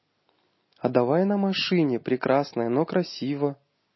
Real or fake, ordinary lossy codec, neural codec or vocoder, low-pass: real; MP3, 24 kbps; none; 7.2 kHz